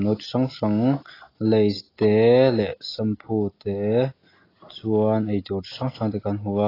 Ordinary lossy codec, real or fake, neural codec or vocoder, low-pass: AAC, 24 kbps; real; none; 5.4 kHz